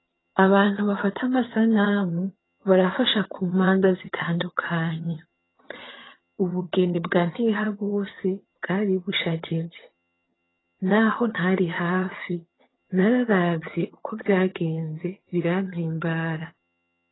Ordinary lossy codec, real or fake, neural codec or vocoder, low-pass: AAC, 16 kbps; fake; vocoder, 22.05 kHz, 80 mel bands, HiFi-GAN; 7.2 kHz